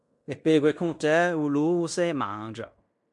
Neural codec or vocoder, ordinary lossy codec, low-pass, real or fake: codec, 16 kHz in and 24 kHz out, 0.9 kbps, LongCat-Audio-Codec, fine tuned four codebook decoder; MP3, 64 kbps; 10.8 kHz; fake